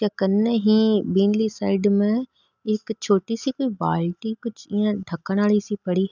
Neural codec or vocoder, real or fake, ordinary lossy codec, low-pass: none; real; none; 7.2 kHz